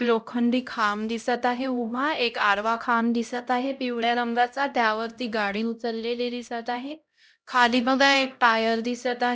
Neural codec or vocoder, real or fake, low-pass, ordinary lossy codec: codec, 16 kHz, 0.5 kbps, X-Codec, HuBERT features, trained on LibriSpeech; fake; none; none